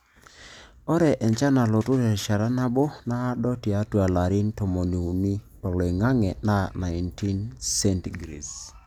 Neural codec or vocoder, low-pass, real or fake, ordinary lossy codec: none; 19.8 kHz; real; none